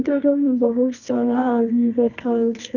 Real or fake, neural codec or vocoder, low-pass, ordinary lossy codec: fake; codec, 24 kHz, 0.9 kbps, WavTokenizer, medium music audio release; 7.2 kHz; none